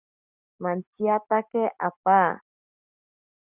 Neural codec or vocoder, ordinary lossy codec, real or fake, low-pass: none; Opus, 64 kbps; real; 3.6 kHz